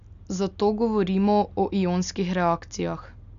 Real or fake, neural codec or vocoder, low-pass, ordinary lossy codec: real; none; 7.2 kHz; none